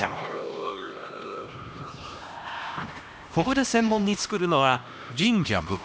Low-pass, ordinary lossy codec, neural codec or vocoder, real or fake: none; none; codec, 16 kHz, 1 kbps, X-Codec, HuBERT features, trained on LibriSpeech; fake